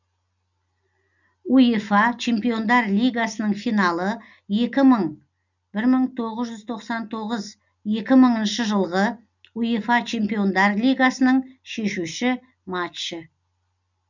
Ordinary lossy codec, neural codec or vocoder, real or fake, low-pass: none; none; real; 7.2 kHz